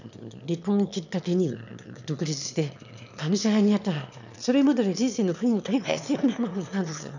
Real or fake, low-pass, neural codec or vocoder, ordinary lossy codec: fake; 7.2 kHz; autoencoder, 22.05 kHz, a latent of 192 numbers a frame, VITS, trained on one speaker; none